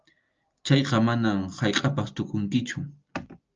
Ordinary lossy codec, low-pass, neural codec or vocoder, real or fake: Opus, 24 kbps; 7.2 kHz; none; real